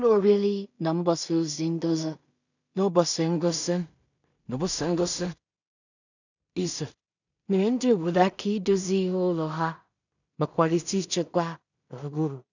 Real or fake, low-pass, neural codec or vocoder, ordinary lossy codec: fake; 7.2 kHz; codec, 16 kHz in and 24 kHz out, 0.4 kbps, LongCat-Audio-Codec, two codebook decoder; none